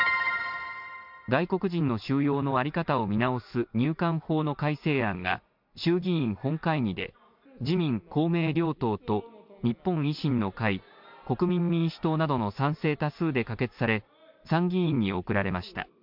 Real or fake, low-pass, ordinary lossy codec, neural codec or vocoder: real; 5.4 kHz; MP3, 48 kbps; none